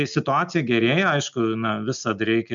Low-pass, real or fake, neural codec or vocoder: 7.2 kHz; real; none